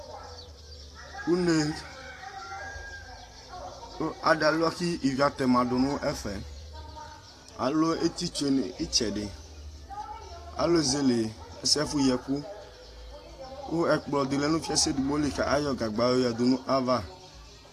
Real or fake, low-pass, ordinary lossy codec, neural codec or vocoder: fake; 14.4 kHz; AAC, 48 kbps; vocoder, 44.1 kHz, 128 mel bands every 512 samples, BigVGAN v2